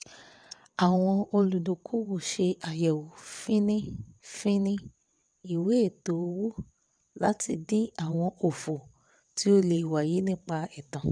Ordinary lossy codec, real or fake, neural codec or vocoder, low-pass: none; fake; vocoder, 22.05 kHz, 80 mel bands, WaveNeXt; 9.9 kHz